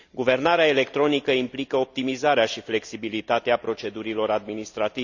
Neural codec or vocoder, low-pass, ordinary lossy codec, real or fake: none; 7.2 kHz; none; real